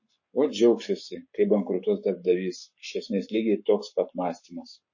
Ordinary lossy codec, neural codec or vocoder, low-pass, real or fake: MP3, 32 kbps; codec, 44.1 kHz, 7.8 kbps, Pupu-Codec; 7.2 kHz; fake